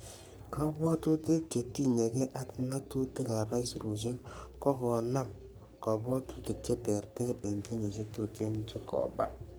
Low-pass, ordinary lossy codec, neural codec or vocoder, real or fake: none; none; codec, 44.1 kHz, 3.4 kbps, Pupu-Codec; fake